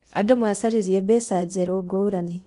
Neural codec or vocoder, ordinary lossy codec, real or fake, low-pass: codec, 16 kHz in and 24 kHz out, 0.6 kbps, FocalCodec, streaming, 4096 codes; none; fake; 10.8 kHz